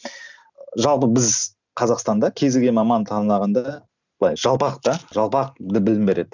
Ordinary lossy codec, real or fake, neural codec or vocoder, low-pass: none; real; none; 7.2 kHz